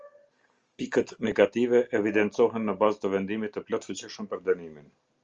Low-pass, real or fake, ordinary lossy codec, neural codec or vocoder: 7.2 kHz; real; Opus, 24 kbps; none